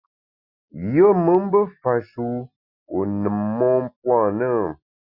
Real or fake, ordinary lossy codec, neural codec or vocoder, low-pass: real; Opus, 64 kbps; none; 5.4 kHz